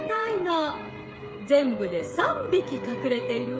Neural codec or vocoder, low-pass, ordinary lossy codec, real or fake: codec, 16 kHz, 8 kbps, FreqCodec, smaller model; none; none; fake